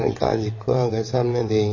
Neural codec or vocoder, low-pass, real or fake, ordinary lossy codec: vocoder, 22.05 kHz, 80 mel bands, WaveNeXt; 7.2 kHz; fake; MP3, 32 kbps